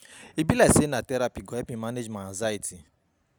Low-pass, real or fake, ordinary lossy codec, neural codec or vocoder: none; real; none; none